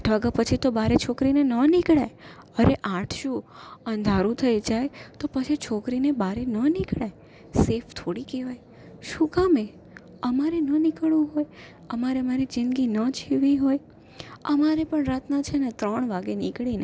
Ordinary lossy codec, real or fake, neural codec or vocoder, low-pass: none; real; none; none